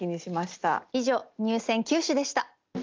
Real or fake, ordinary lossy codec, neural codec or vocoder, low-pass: real; Opus, 32 kbps; none; 7.2 kHz